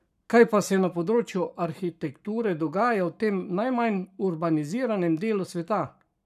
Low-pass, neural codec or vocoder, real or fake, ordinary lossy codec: 14.4 kHz; codec, 44.1 kHz, 7.8 kbps, Pupu-Codec; fake; none